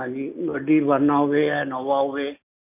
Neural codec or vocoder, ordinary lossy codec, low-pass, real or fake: none; none; 3.6 kHz; real